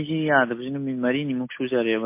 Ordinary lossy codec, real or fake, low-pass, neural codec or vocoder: MP3, 24 kbps; real; 3.6 kHz; none